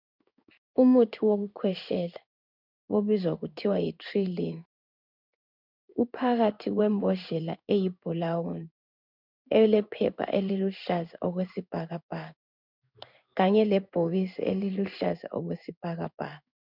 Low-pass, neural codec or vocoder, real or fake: 5.4 kHz; codec, 16 kHz in and 24 kHz out, 1 kbps, XY-Tokenizer; fake